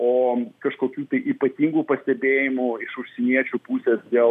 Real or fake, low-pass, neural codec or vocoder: real; 14.4 kHz; none